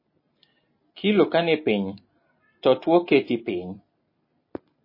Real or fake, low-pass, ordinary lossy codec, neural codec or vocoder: real; 5.4 kHz; MP3, 24 kbps; none